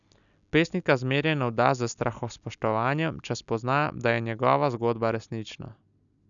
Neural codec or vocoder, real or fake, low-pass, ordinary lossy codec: none; real; 7.2 kHz; none